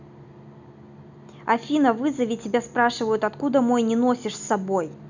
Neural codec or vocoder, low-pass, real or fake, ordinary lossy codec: none; 7.2 kHz; real; none